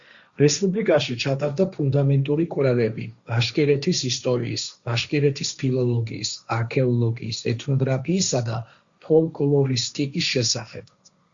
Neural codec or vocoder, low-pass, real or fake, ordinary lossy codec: codec, 16 kHz, 1.1 kbps, Voila-Tokenizer; 7.2 kHz; fake; Opus, 64 kbps